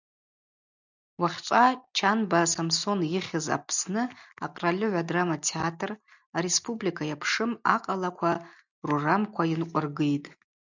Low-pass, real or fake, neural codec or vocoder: 7.2 kHz; real; none